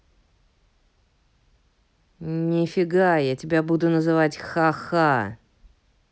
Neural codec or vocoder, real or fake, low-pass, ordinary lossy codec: none; real; none; none